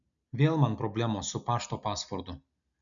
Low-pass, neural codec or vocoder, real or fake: 7.2 kHz; none; real